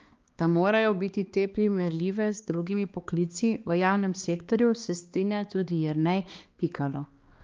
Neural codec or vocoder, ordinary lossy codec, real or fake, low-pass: codec, 16 kHz, 2 kbps, X-Codec, HuBERT features, trained on balanced general audio; Opus, 32 kbps; fake; 7.2 kHz